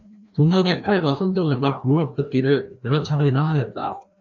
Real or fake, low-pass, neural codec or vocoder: fake; 7.2 kHz; codec, 16 kHz, 1 kbps, FreqCodec, larger model